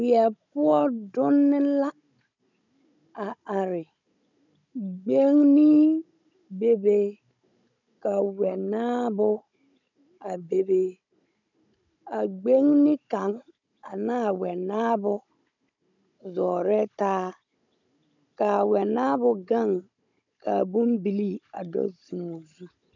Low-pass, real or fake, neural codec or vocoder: 7.2 kHz; fake; codec, 16 kHz, 16 kbps, FunCodec, trained on Chinese and English, 50 frames a second